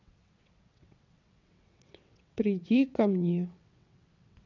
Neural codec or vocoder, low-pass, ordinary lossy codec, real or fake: vocoder, 22.05 kHz, 80 mel bands, WaveNeXt; 7.2 kHz; none; fake